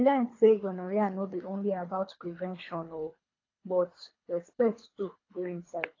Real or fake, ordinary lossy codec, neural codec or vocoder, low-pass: fake; AAC, 32 kbps; codec, 24 kHz, 3 kbps, HILCodec; 7.2 kHz